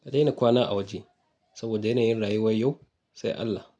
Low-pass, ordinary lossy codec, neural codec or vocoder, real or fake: none; none; none; real